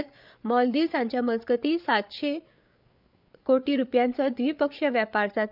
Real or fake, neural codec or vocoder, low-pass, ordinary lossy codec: fake; codec, 16 kHz, 8 kbps, FreqCodec, larger model; 5.4 kHz; none